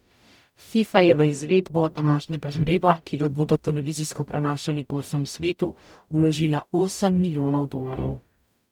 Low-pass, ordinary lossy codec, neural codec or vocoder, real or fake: 19.8 kHz; none; codec, 44.1 kHz, 0.9 kbps, DAC; fake